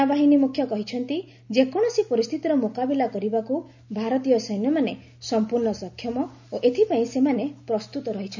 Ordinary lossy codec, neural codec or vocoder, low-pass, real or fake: none; none; 7.2 kHz; real